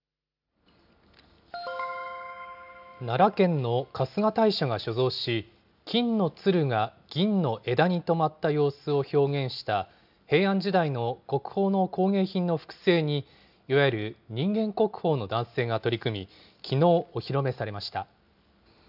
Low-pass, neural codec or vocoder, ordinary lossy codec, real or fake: 5.4 kHz; none; none; real